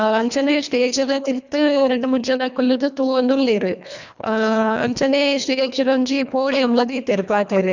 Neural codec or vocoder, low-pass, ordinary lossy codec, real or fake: codec, 24 kHz, 1.5 kbps, HILCodec; 7.2 kHz; none; fake